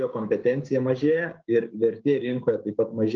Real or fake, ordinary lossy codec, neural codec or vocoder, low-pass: fake; Opus, 24 kbps; codec, 16 kHz, 6 kbps, DAC; 7.2 kHz